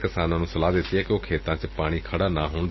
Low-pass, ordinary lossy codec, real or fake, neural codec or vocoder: 7.2 kHz; MP3, 24 kbps; real; none